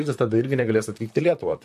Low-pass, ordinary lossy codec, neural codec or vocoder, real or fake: 14.4 kHz; MP3, 64 kbps; vocoder, 44.1 kHz, 128 mel bands, Pupu-Vocoder; fake